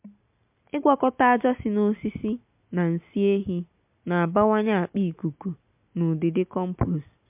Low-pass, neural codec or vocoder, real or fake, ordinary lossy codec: 3.6 kHz; none; real; MP3, 32 kbps